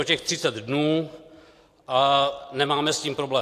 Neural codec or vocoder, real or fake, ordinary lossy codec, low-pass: none; real; AAC, 64 kbps; 14.4 kHz